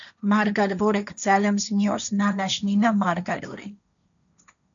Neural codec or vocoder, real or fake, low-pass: codec, 16 kHz, 1.1 kbps, Voila-Tokenizer; fake; 7.2 kHz